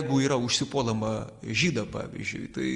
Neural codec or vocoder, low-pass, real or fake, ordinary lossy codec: none; 10.8 kHz; real; Opus, 32 kbps